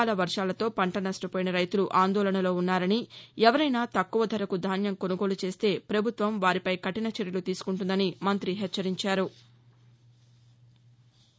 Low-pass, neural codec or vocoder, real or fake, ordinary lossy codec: none; none; real; none